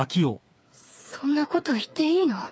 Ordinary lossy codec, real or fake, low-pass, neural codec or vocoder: none; fake; none; codec, 16 kHz, 4 kbps, FreqCodec, smaller model